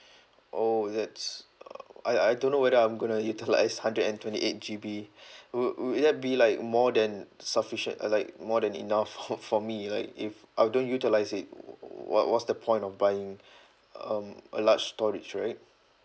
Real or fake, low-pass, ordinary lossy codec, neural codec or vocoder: real; none; none; none